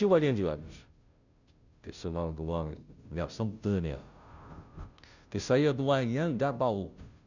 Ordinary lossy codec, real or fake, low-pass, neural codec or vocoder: none; fake; 7.2 kHz; codec, 16 kHz, 0.5 kbps, FunCodec, trained on Chinese and English, 25 frames a second